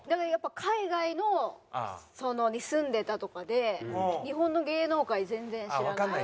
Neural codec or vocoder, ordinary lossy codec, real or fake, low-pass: none; none; real; none